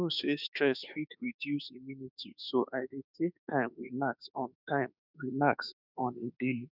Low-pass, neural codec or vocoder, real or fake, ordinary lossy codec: 5.4 kHz; autoencoder, 48 kHz, 32 numbers a frame, DAC-VAE, trained on Japanese speech; fake; none